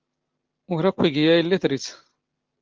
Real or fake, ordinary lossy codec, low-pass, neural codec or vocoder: real; Opus, 16 kbps; 7.2 kHz; none